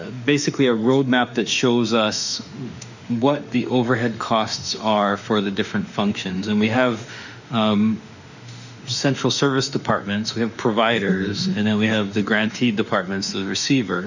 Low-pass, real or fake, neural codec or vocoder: 7.2 kHz; fake; autoencoder, 48 kHz, 32 numbers a frame, DAC-VAE, trained on Japanese speech